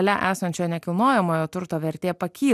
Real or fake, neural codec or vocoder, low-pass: real; none; 14.4 kHz